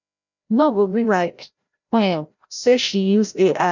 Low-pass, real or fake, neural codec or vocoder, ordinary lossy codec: 7.2 kHz; fake; codec, 16 kHz, 0.5 kbps, FreqCodec, larger model; none